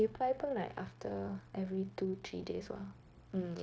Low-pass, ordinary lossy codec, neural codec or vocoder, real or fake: none; none; codec, 16 kHz, 0.9 kbps, LongCat-Audio-Codec; fake